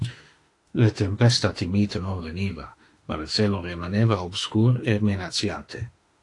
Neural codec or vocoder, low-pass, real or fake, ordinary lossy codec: autoencoder, 48 kHz, 32 numbers a frame, DAC-VAE, trained on Japanese speech; 10.8 kHz; fake; AAC, 48 kbps